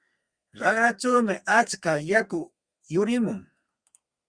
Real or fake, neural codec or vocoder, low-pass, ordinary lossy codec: fake; codec, 32 kHz, 1.9 kbps, SNAC; 9.9 kHz; Opus, 64 kbps